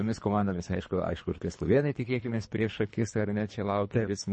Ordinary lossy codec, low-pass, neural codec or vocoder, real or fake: MP3, 32 kbps; 10.8 kHz; codec, 24 kHz, 3 kbps, HILCodec; fake